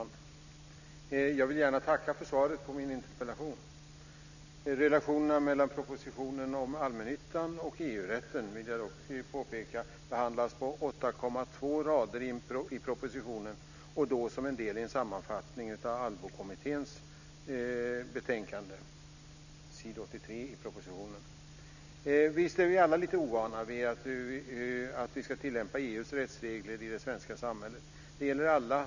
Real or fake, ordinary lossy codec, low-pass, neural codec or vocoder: real; none; 7.2 kHz; none